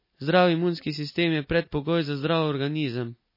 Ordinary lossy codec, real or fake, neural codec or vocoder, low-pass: MP3, 24 kbps; real; none; 5.4 kHz